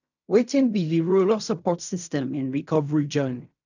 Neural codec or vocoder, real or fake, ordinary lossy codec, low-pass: codec, 16 kHz in and 24 kHz out, 0.4 kbps, LongCat-Audio-Codec, fine tuned four codebook decoder; fake; none; 7.2 kHz